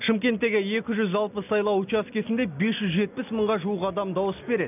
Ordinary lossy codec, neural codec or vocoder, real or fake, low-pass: none; none; real; 3.6 kHz